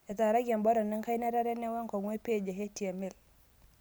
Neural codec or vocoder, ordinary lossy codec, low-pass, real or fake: none; none; none; real